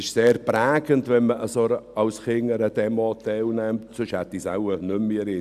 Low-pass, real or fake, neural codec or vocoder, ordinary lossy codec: 14.4 kHz; real; none; none